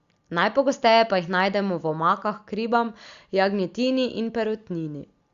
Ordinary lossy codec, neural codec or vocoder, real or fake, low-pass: Opus, 64 kbps; none; real; 7.2 kHz